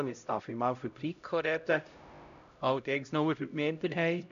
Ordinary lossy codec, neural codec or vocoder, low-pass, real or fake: none; codec, 16 kHz, 0.5 kbps, X-Codec, HuBERT features, trained on LibriSpeech; 7.2 kHz; fake